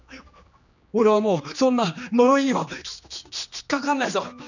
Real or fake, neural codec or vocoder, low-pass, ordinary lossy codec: fake; codec, 16 kHz, 2 kbps, X-Codec, HuBERT features, trained on general audio; 7.2 kHz; none